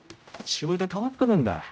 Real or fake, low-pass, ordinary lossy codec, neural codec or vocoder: fake; none; none; codec, 16 kHz, 0.5 kbps, X-Codec, HuBERT features, trained on general audio